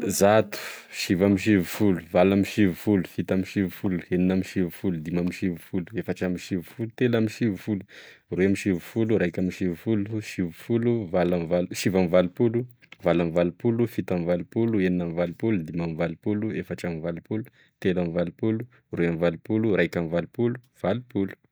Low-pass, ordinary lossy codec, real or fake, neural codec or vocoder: none; none; real; none